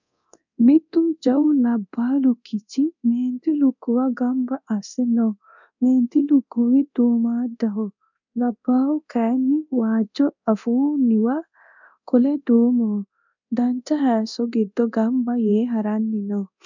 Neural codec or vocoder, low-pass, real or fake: codec, 24 kHz, 0.9 kbps, DualCodec; 7.2 kHz; fake